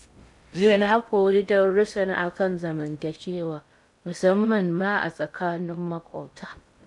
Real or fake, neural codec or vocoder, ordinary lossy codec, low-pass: fake; codec, 16 kHz in and 24 kHz out, 0.6 kbps, FocalCodec, streaming, 2048 codes; none; 10.8 kHz